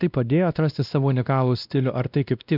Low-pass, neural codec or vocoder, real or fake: 5.4 kHz; codec, 16 kHz, 1 kbps, X-Codec, WavLM features, trained on Multilingual LibriSpeech; fake